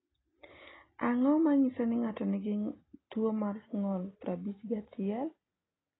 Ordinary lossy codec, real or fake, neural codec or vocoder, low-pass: AAC, 16 kbps; real; none; 7.2 kHz